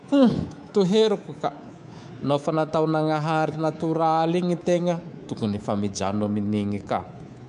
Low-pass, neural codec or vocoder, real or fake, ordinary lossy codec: 10.8 kHz; codec, 24 kHz, 3.1 kbps, DualCodec; fake; none